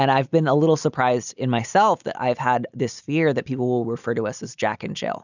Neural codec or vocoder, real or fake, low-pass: none; real; 7.2 kHz